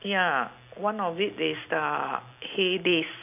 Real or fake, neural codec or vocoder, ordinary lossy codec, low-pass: real; none; none; 3.6 kHz